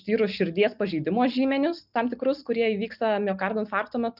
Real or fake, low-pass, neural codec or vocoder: real; 5.4 kHz; none